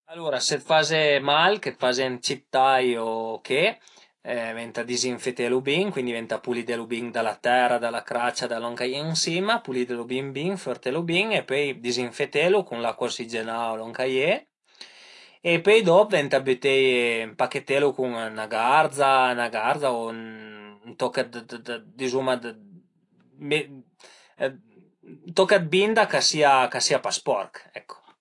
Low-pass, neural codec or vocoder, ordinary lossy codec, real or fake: 10.8 kHz; none; AAC, 48 kbps; real